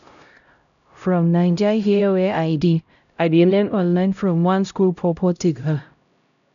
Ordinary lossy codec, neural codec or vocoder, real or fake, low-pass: none; codec, 16 kHz, 0.5 kbps, X-Codec, HuBERT features, trained on LibriSpeech; fake; 7.2 kHz